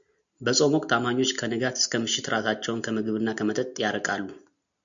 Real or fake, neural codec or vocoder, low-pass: real; none; 7.2 kHz